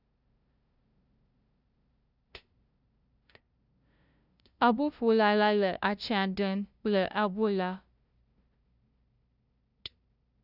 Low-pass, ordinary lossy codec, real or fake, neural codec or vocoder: 5.4 kHz; none; fake; codec, 16 kHz, 0.5 kbps, FunCodec, trained on LibriTTS, 25 frames a second